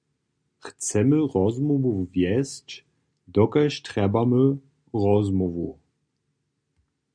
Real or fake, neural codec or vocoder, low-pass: real; none; 9.9 kHz